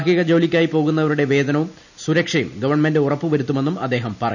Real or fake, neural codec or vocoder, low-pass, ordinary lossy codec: real; none; 7.2 kHz; none